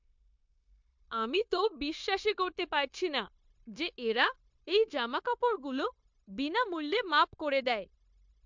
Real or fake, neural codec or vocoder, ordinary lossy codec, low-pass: fake; codec, 16 kHz, 0.9 kbps, LongCat-Audio-Codec; MP3, 64 kbps; 7.2 kHz